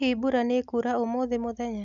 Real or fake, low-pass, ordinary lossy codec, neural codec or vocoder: real; 7.2 kHz; none; none